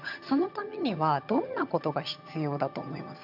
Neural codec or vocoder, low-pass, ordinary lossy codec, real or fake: vocoder, 22.05 kHz, 80 mel bands, HiFi-GAN; 5.4 kHz; MP3, 48 kbps; fake